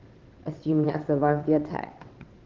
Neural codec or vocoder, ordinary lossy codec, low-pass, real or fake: vocoder, 22.05 kHz, 80 mel bands, WaveNeXt; Opus, 16 kbps; 7.2 kHz; fake